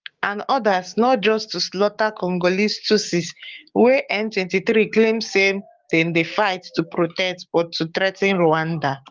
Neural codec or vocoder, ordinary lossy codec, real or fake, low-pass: codec, 16 kHz, 6 kbps, DAC; Opus, 32 kbps; fake; 7.2 kHz